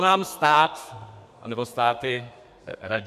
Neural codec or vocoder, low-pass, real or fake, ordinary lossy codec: codec, 32 kHz, 1.9 kbps, SNAC; 14.4 kHz; fake; MP3, 96 kbps